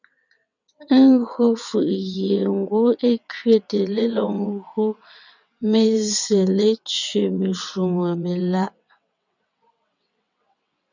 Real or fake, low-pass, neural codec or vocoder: fake; 7.2 kHz; vocoder, 22.05 kHz, 80 mel bands, WaveNeXt